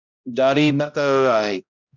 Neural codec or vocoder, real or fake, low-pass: codec, 16 kHz, 0.5 kbps, X-Codec, HuBERT features, trained on balanced general audio; fake; 7.2 kHz